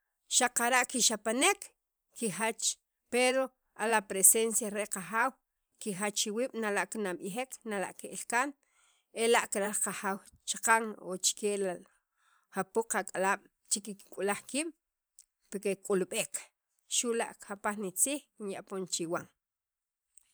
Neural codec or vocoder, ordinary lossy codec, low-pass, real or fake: vocoder, 44.1 kHz, 128 mel bands every 512 samples, BigVGAN v2; none; none; fake